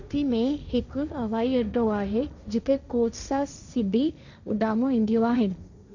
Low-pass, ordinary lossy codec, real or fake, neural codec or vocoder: 7.2 kHz; none; fake; codec, 16 kHz, 1.1 kbps, Voila-Tokenizer